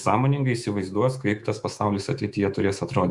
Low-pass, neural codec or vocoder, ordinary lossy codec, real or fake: 10.8 kHz; autoencoder, 48 kHz, 128 numbers a frame, DAC-VAE, trained on Japanese speech; Opus, 64 kbps; fake